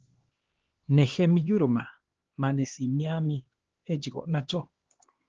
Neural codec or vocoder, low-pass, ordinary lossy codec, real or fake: codec, 16 kHz, 2 kbps, X-Codec, HuBERT features, trained on LibriSpeech; 7.2 kHz; Opus, 16 kbps; fake